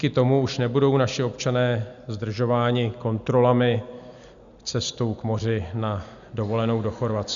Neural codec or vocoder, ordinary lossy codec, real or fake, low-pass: none; MP3, 96 kbps; real; 7.2 kHz